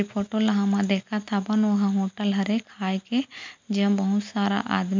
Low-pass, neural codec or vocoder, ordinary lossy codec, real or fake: 7.2 kHz; none; MP3, 64 kbps; real